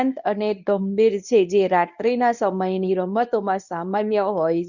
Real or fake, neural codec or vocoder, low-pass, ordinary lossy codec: fake; codec, 24 kHz, 0.9 kbps, WavTokenizer, medium speech release version 1; 7.2 kHz; none